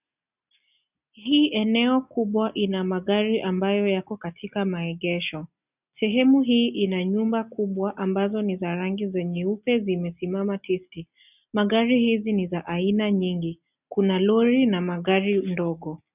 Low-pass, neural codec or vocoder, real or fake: 3.6 kHz; none; real